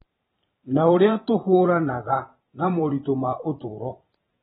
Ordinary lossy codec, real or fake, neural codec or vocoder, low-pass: AAC, 16 kbps; fake; vocoder, 44.1 kHz, 128 mel bands every 512 samples, BigVGAN v2; 19.8 kHz